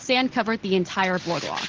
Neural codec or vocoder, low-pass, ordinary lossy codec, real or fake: none; 7.2 kHz; Opus, 16 kbps; real